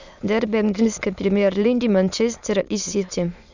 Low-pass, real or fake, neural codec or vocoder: 7.2 kHz; fake; autoencoder, 22.05 kHz, a latent of 192 numbers a frame, VITS, trained on many speakers